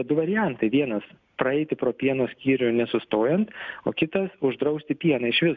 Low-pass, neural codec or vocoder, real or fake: 7.2 kHz; none; real